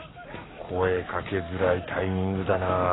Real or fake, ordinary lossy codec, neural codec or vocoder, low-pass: fake; AAC, 16 kbps; codec, 44.1 kHz, 7.8 kbps, Pupu-Codec; 7.2 kHz